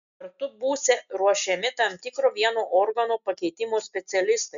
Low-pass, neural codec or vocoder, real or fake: 7.2 kHz; none; real